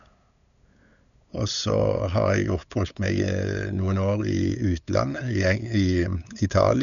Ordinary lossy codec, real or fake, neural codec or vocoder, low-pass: none; fake; codec, 16 kHz, 8 kbps, FunCodec, trained on LibriTTS, 25 frames a second; 7.2 kHz